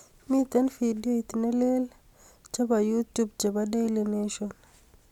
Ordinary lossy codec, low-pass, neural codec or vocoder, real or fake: none; 19.8 kHz; none; real